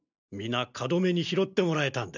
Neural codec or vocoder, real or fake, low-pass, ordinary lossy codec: none; real; 7.2 kHz; none